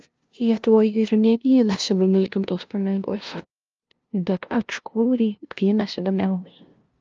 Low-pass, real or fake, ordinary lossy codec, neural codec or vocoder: 7.2 kHz; fake; Opus, 24 kbps; codec, 16 kHz, 0.5 kbps, FunCodec, trained on LibriTTS, 25 frames a second